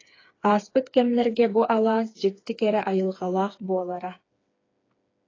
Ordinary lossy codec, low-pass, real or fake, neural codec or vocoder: AAC, 32 kbps; 7.2 kHz; fake; codec, 16 kHz, 4 kbps, FreqCodec, smaller model